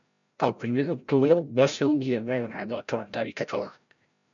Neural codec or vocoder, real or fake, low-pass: codec, 16 kHz, 0.5 kbps, FreqCodec, larger model; fake; 7.2 kHz